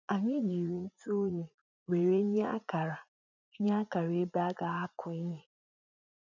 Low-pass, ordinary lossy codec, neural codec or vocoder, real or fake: 7.2 kHz; none; vocoder, 44.1 kHz, 80 mel bands, Vocos; fake